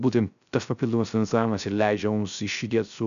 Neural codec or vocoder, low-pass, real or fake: codec, 16 kHz, 0.3 kbps, FocalCodec; 7.2 kHz; fake